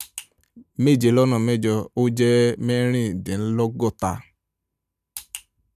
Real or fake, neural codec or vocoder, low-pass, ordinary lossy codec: real; none; 14.4 kHz; none